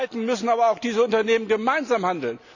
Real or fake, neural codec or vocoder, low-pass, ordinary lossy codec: real; none; 7.2 kHz; none